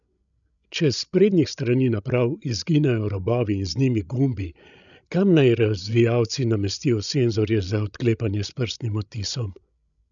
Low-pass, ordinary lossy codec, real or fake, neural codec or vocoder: 7.2 kHz; none; fake; codec, 16 kHz, 16 kbps, FreqCodec, larger model